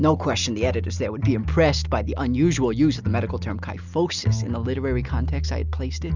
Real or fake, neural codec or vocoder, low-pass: real; none; 7.2 kHz